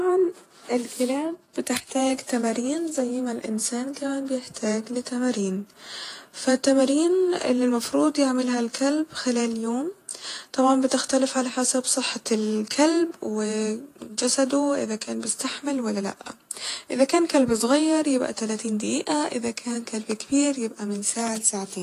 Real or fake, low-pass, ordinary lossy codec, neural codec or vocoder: fake; 14.4 kHz; AAC, 48 kbps; vocoder, 48 kHz, 128 mel bands, Vocos